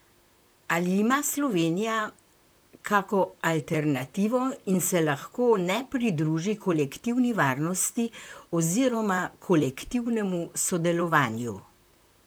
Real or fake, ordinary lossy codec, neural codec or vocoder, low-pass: fake; none; vocoder, 44.1 kHz, 128 mel bands, Pupu-Vocoder; none